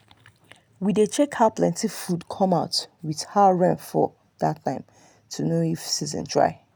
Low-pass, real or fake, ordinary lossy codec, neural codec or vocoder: none; real; none; none